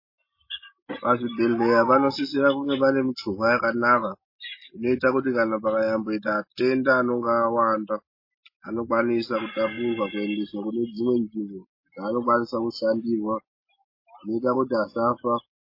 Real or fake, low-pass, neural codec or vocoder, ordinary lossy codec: real; 5.4 kHz; none; MP3, 24 kbps